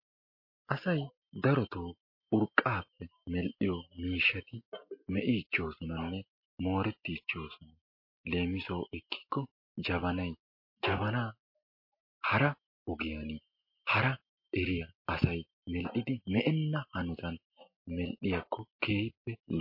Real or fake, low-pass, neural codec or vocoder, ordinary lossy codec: real; 5.4 kHz; none; MP3, 32 kbps